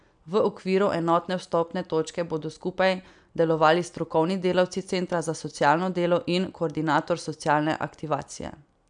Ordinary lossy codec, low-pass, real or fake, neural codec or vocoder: none; 9.9 kHz; real; none